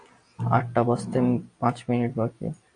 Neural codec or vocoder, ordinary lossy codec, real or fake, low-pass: none; AAC, 48 kbps; real; 9.9 kHz